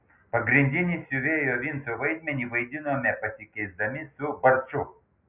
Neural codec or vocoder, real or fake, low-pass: none; real; 3.6 kHz